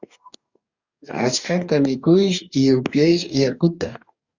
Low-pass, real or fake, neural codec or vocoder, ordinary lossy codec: 7.2 kHz; fake; codec, 44.1 kHz, 2.6 kbps, DAC; Opus, 64 kbps